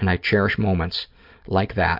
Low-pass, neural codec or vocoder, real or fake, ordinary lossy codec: 5.4 kHz; none; real; MP3, 48 kbps